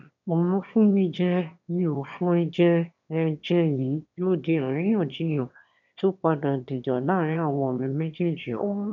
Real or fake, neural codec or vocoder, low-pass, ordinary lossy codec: fake; autoencoder, 22.05 kHz, a latent of 192 numbers a frame, VITS, trained on one speaker; 7.2 kHz; none